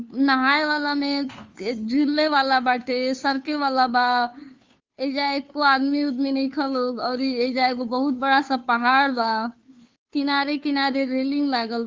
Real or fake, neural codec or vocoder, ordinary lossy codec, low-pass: fake; codec, 16 kHz, 2 kbps, FunCodec, trained on Chinese and English, 25 frames a second; Opus, 32 kbps; 7.2 kHz